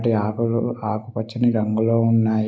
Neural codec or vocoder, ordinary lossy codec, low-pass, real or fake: none; none; none; real